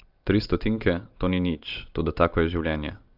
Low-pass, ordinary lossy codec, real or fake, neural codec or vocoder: 5.4 kHz; Opus, 24 kbps; real; none